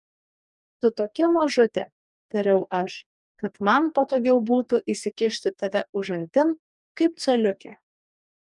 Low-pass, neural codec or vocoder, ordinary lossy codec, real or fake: 10.8 kHz; codec, 44.1 kHz, 2.6 kbps, DAC; MP3, 96 kbps; fake